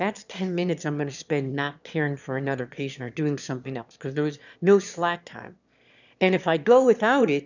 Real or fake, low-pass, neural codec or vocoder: fake; 7.2 kHz; autoencoder, 22.05 kHz, a latent of 192 numbers a frame, VITS, trained on one speaker